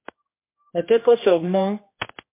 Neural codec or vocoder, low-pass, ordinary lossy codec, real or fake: codec, 16 kHz, 1 kbps, X-Codec, HuBERT features, trained on general audio; 3.6 kHz; MP3, 24 kbps; fake